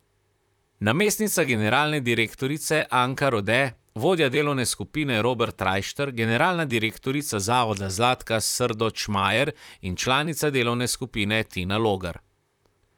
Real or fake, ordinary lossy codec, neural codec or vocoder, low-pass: fake; none; vocoder, 44.1 kHz, 128 mel bands, Pupu-Vocoder; 19.8 kHz